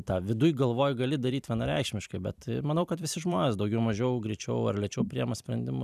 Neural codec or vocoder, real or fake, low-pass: vocoder, 44.1 kHz, 128 mel bands every 256 samples, BigVGAN v2; fake; 14.4 kHz